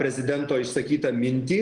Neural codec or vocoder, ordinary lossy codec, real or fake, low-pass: none; Opus, 24 kbps; real; 9.9 kHz